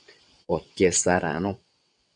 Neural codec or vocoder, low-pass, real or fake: vocoder, 22.05 kHz, 80 mel bands, WaveNeXt; 9.9 kHz; fake